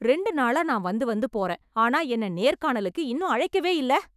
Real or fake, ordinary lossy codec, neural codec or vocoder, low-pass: fake; none; autoencoder, 48 kHz, 128 numbers a frame, DAC-VAE, trained on Japanese speech; 14.4 kHz